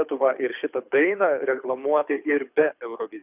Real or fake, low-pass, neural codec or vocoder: fake; 3.6 kHz; codec, 24 kHz, 6 kbps, HILCodec